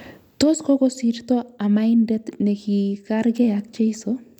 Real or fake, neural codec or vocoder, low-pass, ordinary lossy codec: real; none; 19.8 kHz; none